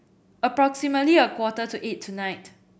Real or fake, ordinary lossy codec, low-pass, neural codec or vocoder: real; none; none; none